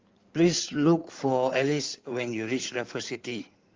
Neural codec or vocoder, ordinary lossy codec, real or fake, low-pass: codec, 16 kHz in and 24 kHz out, 2.2 kbps, FireRedTTS-2 codec; Opus, 32 kbps; fake; 7.2 kHz